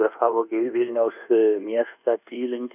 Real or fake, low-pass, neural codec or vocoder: fake; 3.6 kHz; codec, 24 kHz, 1.2 kbps, DualCodec